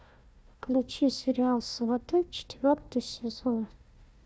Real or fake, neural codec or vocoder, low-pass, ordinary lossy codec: fake; codec, 16 kHz, 1 kbps, FunCodec, trained on Chinese and English, 50 frames a second; none; none